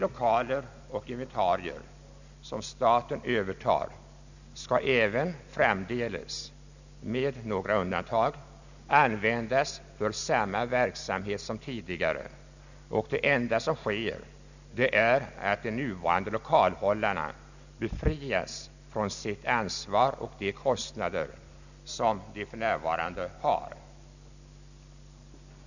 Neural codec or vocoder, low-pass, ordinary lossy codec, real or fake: none; 7.2 kHz; none; real